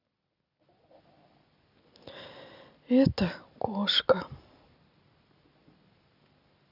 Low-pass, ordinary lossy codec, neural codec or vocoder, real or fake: 5.4 kHz; Opus, 64 kbps; none; real